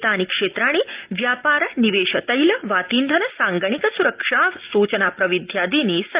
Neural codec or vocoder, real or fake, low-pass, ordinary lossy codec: none; real; 3.6 kHz; Opus, 32 kbps